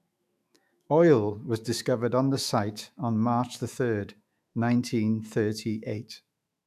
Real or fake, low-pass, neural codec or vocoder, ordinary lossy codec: fake; 14.4 kHz; autoencoder, 48 kHz, 128 numbers a frame, DAC-VAE, trained on Japanese speech; none